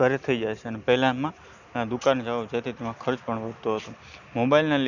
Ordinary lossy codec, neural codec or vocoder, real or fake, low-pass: none; none; real; 7.2 kHz